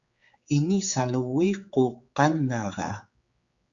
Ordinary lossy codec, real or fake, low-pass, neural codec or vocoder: Opus, 64 kbps; fake; 7.2 kHz; codec, 16 kHz, 4 kbps, X-Codec, HuBERT features, trained on general audio